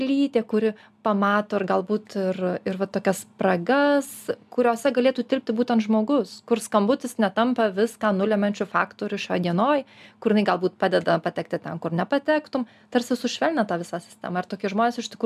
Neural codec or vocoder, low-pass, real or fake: none; 14.4 kHz; real